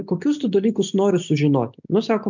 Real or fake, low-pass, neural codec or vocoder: real; 7.2 kHz; none